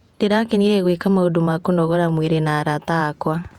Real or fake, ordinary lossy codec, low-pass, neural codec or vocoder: fake; Opus, 64 kbps; 19.8 kHz; vocoder, 48 kHz, 128 mel bands, Vocos